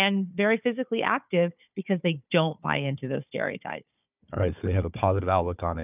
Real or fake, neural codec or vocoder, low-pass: fake; codec, 16 kHz, 4 kbps, FunCodec, trained on Chinese and English, 50 frames a second; 3.6 kHz